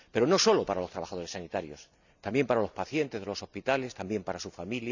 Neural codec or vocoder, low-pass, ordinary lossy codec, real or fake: none; 7.2 kHz; none; real